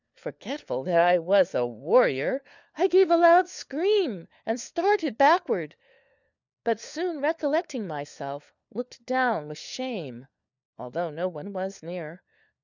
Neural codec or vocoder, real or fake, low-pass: codec, 16 kHz, 4 kbps, FunCodec, trained on LibriTTS, 50 frames a second; fake; 7.2 kHz